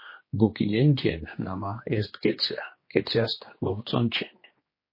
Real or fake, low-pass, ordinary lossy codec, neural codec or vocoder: fake; 5.4 kHz; MP3, 24 kbps; codec, 16 kHz, 2 kbps, X-Codec, HuBERT features, trained on general audio